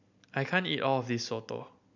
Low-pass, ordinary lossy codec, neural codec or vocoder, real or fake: 7.2 kHz; none; none; real